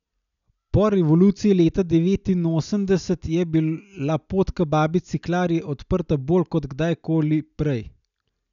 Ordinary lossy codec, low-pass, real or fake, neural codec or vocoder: none; 7.2 kHz; real; none